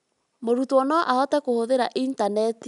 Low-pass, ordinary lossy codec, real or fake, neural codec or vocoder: 10.8 kHz; none; real; none